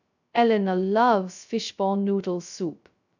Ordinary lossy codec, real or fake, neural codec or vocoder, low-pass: none; fake; codec, 16 kHz, 0.2 kbps, FocalCodec; 7.2 kHz